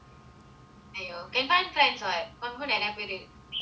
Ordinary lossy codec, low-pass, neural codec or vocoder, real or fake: none; none; none; real